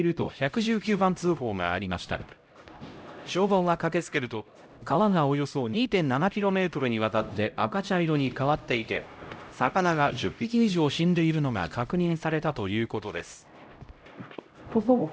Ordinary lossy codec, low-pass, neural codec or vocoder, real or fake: none; none; codec, 16 kHz, 0.5 kbps, X-Codec, HuBERT features, trained on LibriSpeech; fake